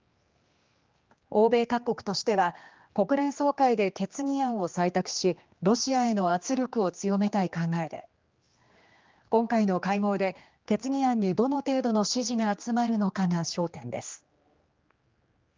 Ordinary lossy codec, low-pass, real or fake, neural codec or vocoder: Opus, 32 kbps; 7.2 kHz; fake; codec, 16 kHz, 2 kbps, X-Codec, HuBERT features, trained on general audio